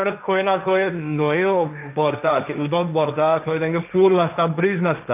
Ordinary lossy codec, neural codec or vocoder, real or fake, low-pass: none; codec, 16 kHz, 1.1 kbps, Voila-Tokenizer; fake; 3.6 kHz